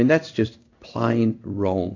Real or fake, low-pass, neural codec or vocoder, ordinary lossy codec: fake; 7.2 kHz; codec, 16 kHz in and 24 kHz out, 1 kbps, XY-Tokenizer; AAC, 48 kbps